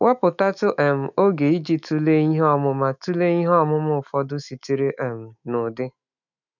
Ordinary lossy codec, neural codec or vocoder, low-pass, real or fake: none; autoencoder, 48 kHz, 128 numbers a frame, DAC-VAE, trained on Japanese speech; 7.2 kHz; fake